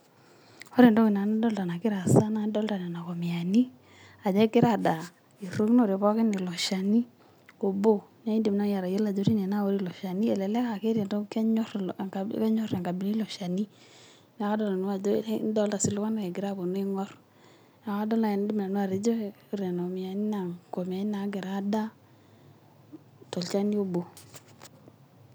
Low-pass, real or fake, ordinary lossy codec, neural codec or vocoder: none; real; none; none